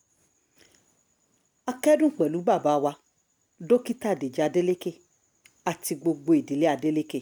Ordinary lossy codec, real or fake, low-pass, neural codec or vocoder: none; real; none; none